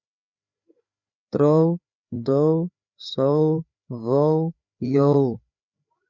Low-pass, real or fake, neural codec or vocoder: 7.2 kHz; fake; codec, 16 kHz, 4 kbps, FreqCodec, larger model